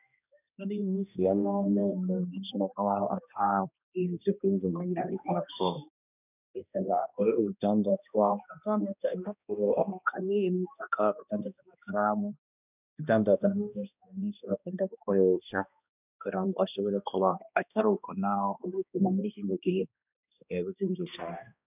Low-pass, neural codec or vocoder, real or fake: 3.6 kHz; codec, 16 kHz, 1 kbps, X-Codec, HuBERT features, trained on balanced general audio; fake